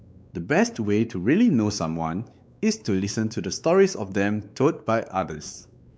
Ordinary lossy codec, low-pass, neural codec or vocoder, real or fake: none; none; codec, 16 kHz, 4 kbps, X-Codec, WavLM features, trained on Multilingual LibriSpeech; fake